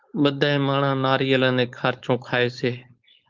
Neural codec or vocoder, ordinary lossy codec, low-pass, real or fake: codec, 16 kHz, 4.8 kbps, FACodec; Opus, 32 kbps; 7.2 kHz; fake